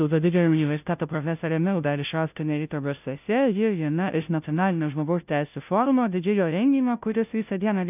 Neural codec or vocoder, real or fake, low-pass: codec, 16 kHz, 0.5 kbps, FunCodec, trained on Chinese and English, 25 frames a second; fake; 3.6 kHz